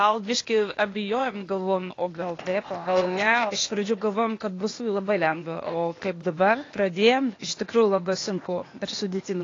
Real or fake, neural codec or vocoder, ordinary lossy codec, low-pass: fake; codec, 16 kHz, 0.8 kbps, ZipCodec; AAC, 32 kbps; 7.2 kHz